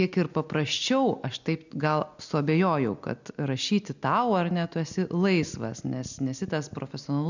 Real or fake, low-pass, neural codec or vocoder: real; 7.2 kHz; none